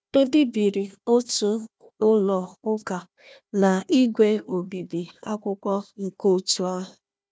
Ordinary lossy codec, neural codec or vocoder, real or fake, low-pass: none; codec, 16 kHz, 1 kbps, FunCodec, trained on Chinese and English, 50 frames a second; fake; none